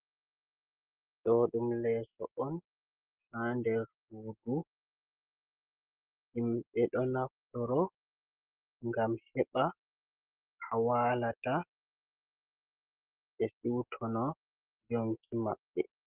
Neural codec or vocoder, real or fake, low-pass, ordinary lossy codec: none; real; 3.6 kHz; Opus, 16 kbps